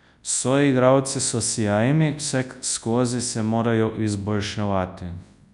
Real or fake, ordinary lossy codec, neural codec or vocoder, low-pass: fake; none; codec, 24 kHz, 0.9 kbps, WavTokenizer, large speech release; 10.8 kHz